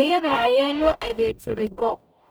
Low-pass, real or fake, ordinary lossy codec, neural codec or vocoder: none; fake; none; codec, 44.1 kHz, 0.9 kbps, DAC